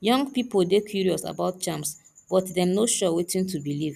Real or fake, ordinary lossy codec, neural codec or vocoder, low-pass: real; none; none; 14.4 kHz